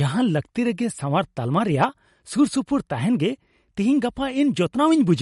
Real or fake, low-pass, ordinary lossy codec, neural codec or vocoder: real; 19.8 kHz; MP3, 48 kbps; none